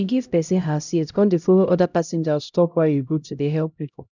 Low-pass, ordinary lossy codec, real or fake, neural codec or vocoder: 7.2 kHz; none; fake; codec, 16 kHz, 0.5 kbps, X-Codec, HuBERT features, trained on LibriSpeech